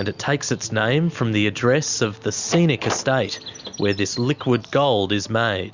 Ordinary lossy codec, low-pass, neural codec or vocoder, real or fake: Opus, 64 kbps; 7.2 kHz; none; real